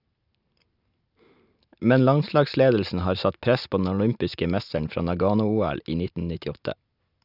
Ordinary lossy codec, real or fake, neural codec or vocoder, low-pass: none; real; none; 5.4 kHz